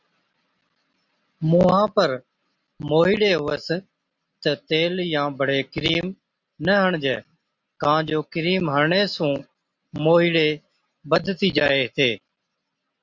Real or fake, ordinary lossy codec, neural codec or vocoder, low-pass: real; Opus, 64 kbps; none; 7.2 kHz